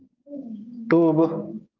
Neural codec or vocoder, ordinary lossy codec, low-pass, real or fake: codec, 16 kHz, 6 kbps, DAC; Opus, 32 kbps; 7.2 kHz; fake